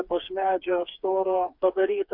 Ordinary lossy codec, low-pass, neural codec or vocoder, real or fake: MP3, 48 kbps; 5.4 kHz; codec, 16 kHz, 4 kbps, FreqCodec, smaller model; fake